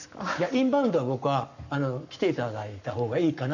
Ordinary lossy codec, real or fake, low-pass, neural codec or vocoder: none; fake; 7.2 kHz; codec, 44.1 kHz, 7.8 kbps, Pupu-Codec